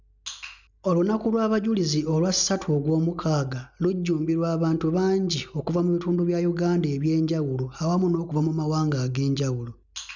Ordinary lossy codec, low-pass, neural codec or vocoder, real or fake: none; 7.2 kHz; none; real